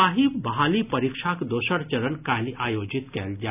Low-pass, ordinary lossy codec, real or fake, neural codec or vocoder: 3.6 kHz; none; real; none